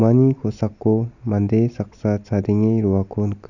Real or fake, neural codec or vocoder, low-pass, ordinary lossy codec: real; none; 7.2 kHz; none